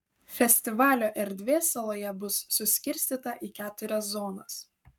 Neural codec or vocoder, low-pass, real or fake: codec, 44.1 kHz, 7.8 kbps, Pupu-Codec; 19.8 kHz; fake